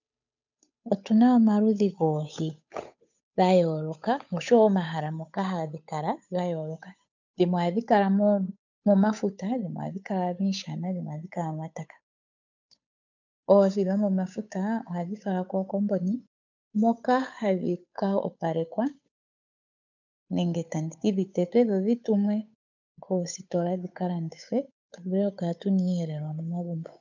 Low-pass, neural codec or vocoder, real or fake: 7.2 kHz; codec, 16 kHz, 8 kbps, FunCodec, trained on Chinese and English, 25 frames a second; fake